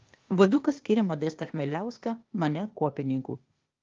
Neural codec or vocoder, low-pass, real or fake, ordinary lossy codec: codec, 16 kHz, 0.8 kbps, ZipCodec; 7.2 kHz; fake; Opus, 32 kbps